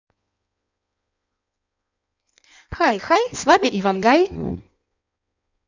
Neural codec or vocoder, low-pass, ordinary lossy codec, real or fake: codec, 16 kHz in and 24 kHz out, 1.1 kbps, FireRedTTS-2 codec; 7.2 kHz; none; fake